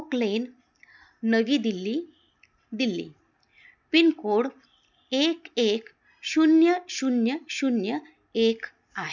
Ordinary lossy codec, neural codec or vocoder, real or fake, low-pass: none; none; real; 7.2 kHz